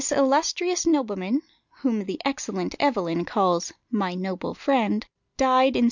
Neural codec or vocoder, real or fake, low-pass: none; real; 7.2 kHz